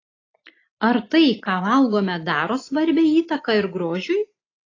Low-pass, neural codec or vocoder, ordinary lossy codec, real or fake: 7.2 kHz; none; AAC, 32 kbps; real